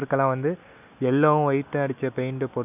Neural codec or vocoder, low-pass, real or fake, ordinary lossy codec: none; 3.6 kHz; real; none